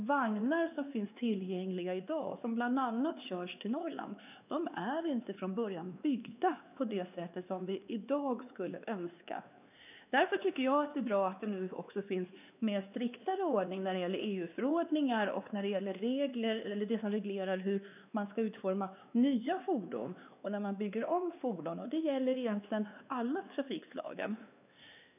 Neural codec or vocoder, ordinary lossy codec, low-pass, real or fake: codec, 16 kHz, 2 kbps, X-Codec, WavLM features, trained on Multilingual LibriSpeech; none; 3.6 kHz; fake